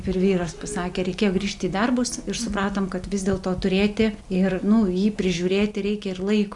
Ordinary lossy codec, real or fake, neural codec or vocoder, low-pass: Opus, 64 kbps; real; none; 10.8 kHz